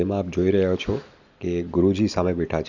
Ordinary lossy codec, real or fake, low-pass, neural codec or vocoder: none; real; 7.2 kHz; none